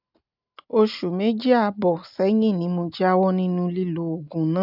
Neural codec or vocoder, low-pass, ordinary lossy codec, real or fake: none; 5.4 kHz; none; real